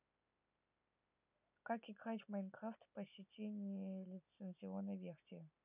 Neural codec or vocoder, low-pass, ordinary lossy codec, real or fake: none; 3.6 kHz; none; real